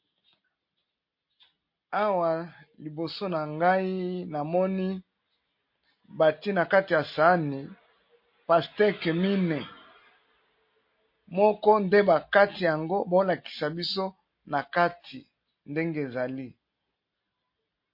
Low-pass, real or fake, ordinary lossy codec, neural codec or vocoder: 5.4 kHz; real; MP3, 32 kbps; none